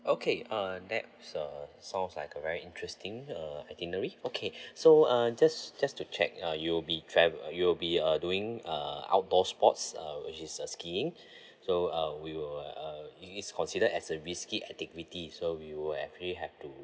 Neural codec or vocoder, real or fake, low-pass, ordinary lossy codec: none; real; none; none